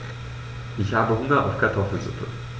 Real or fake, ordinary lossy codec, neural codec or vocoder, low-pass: real; none; none; none